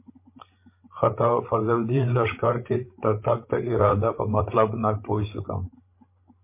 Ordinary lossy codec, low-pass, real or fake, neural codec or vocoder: MP3, 24 kbps; 3.6 kHz; fake; codec, 16 kHz, 8 kbps, FreqCodec, larger model